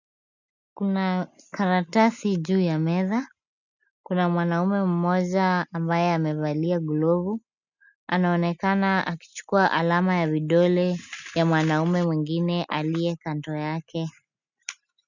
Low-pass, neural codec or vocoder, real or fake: 7.2 kHz; none; real